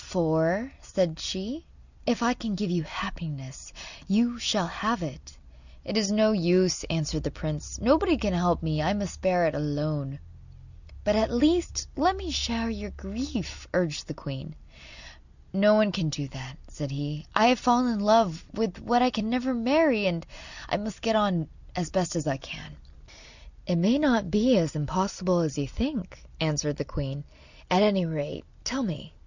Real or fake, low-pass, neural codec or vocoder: real; 7.2 kHz; none